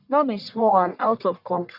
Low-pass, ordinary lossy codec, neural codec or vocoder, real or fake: 5.4 kHz; none; codec, 44.1 kHz, 1.7 kbps, Pupu-Codec; fake